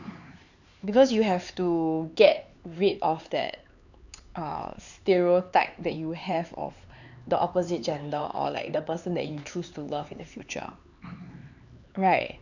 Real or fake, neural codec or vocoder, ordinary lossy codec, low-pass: fake; codec, 16 kHz, 4 kbps, X-Codec, HuBERT features, trained on LibriSpeech; none; 7.2 kHz